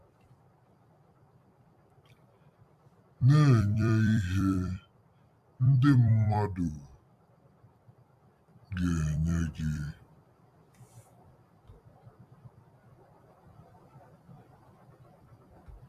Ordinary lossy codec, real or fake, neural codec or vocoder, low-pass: AAC, 96 kbps; fake; vocoder, 44.1 kHz, 128 mel bands every 256 samples, BigVGAN v2; 14.4 kHz